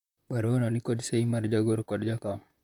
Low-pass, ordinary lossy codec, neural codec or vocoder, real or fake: 19.8 kHz; none; vocoder, 44.1 kHz, 128 mel bands, Pupu-Vocoder; fake